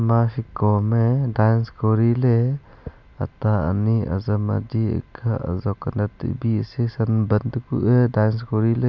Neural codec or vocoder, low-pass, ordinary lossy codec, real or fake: none; 7.2 kHz; none; real